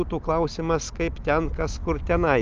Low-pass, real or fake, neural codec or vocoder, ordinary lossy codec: 7.2 kHz; real; none; Opus, 24 kbps